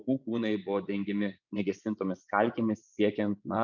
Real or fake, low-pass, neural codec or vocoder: fake; 7.2 kHz; vocoder, 24 kHz, 100 mel bands, Vocos